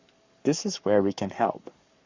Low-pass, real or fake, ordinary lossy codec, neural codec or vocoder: 7.2 kHz; fake; Opus, 64 kbps; codec, 44.1 kHz, 7.8 kbps, Pupu-Codec